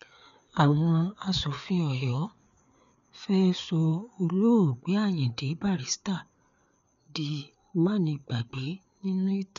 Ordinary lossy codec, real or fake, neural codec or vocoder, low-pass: none; fake; codec, 16 kHz, 4 kbps, FreqCodec, larger model; 7.2 kHz